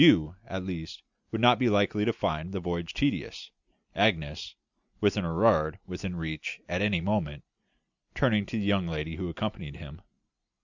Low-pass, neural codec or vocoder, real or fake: 7.2 kHz; none; real